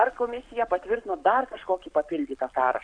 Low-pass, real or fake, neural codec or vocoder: 9.9 kHz; fake; codec, 44.1 kHz, 7.8 kbps, Pupu-Codec